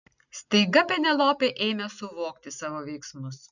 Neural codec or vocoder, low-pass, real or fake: none; 7.2 kHz; real